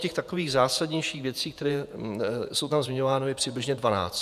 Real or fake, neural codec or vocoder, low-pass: fake; vocoder, 48 kHz, 128 mel bands, Vocos; 14.4 kHz